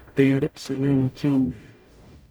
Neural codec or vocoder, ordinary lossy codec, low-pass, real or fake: codec, 44.1 kHz, 0.9 kbps, DAC; none; none; fake